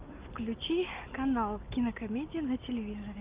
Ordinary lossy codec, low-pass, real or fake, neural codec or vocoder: Opus, 24 kbps; 3.6 kHz; real; none